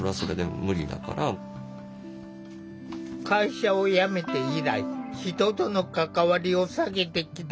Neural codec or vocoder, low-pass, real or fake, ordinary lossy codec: none; none; real; none